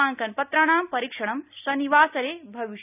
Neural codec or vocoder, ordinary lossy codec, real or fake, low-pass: none; none; real; 3.6 kHz